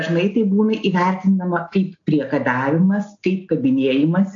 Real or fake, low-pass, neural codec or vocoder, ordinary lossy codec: real; 7.2 kHz; none; AAC, 48 kbps